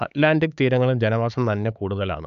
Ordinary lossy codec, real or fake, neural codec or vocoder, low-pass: none; fake; codec, 16 kHz, 4 kbps, X-Codec, HuBERT features, trained on LibriSpeech; 7.2 kHz